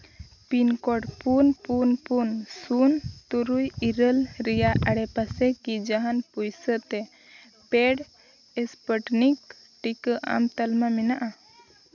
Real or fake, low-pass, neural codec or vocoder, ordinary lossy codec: real; 7.2 kHz; none; none